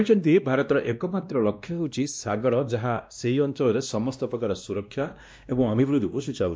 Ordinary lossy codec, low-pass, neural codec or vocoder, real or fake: none; none; codec, 16 kHz, 1 kbps, X-Codec, WavLM features, trained on Multilingual LibriSpeech; fake